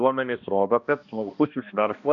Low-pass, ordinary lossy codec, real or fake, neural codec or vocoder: 7.2 kHz; MP3, 96 kbps; fake; codec, 16 kHz, 1 kbps, X-Codec, HuBERT features, trained on balanced general audio